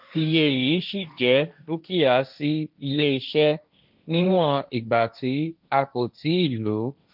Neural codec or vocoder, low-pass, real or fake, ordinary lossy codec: codec, 16 kHz, 1.1 kbps, Voila-Tokenizer; 5.4 kHz; fake; none